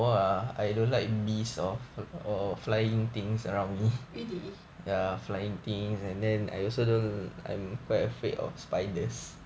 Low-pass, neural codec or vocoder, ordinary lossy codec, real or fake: none; none; none; real